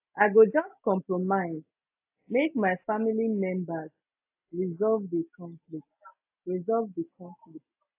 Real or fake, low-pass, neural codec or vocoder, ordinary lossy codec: real; 3.6 kHz; none; AAC, 32 kbps